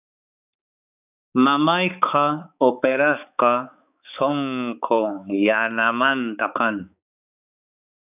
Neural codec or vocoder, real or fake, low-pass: codec, 16 kHz, 4 kbps, X-Codec, HuBERT features, trained on balanced general audio; fake; 3.6 kHz